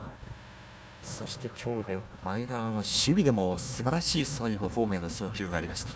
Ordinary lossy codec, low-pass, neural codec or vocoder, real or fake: none; none; codec, 16 kHz, 1 kbps, FunCodec, trained on Chinese and English, 50 frames a second; fake